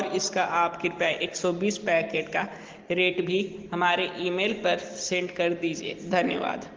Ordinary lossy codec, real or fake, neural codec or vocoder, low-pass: Opus, 16 kbps; real; none; 7.2 kHz